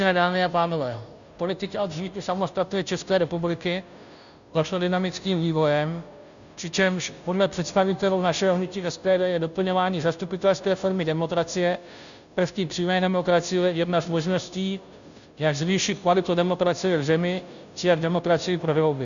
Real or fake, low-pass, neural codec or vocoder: fake; 7.2 kHz; codec, 16 kHz, 0.5 kbps, FunCodec, trained on Chinese and English, 25 frames a second